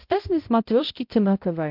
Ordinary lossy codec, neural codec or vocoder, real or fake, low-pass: MP3, 48 kbps; codec, 16 kHz, 0.5 kbps, X-Codec, HuBERT features, trained on balanced general audio; fake; 5.4 kHz